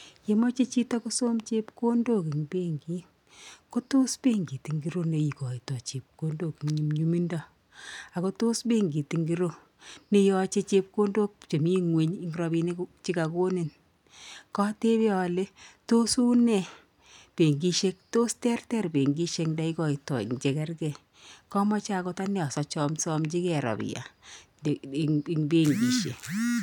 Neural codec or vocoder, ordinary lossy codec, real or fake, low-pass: none; none; real; 19.8 kHz